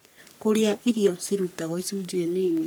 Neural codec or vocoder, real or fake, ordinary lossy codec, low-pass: codec, 44.1 kHz, 3.4 kbps, Pupu-Codec; fake; none; none